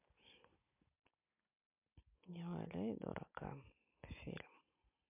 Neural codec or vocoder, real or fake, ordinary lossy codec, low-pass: none; real; MP3, 32 kbps; 3.6 kHz